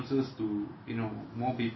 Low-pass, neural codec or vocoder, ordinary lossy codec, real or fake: 7.2 kHz; none; MP3, 24 kbps; real